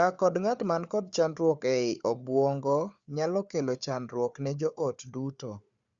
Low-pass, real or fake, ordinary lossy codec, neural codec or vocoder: 7.2 kHz; fake; Opus, 64 kbps; codec, 16 kHz, 4 kbps, FunCodec, trained on Chinese and English, 50 frames a second